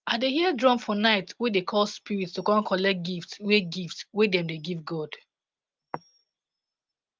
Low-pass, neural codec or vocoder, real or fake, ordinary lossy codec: 7.2 kHz; none; real; Opus, 32 kbps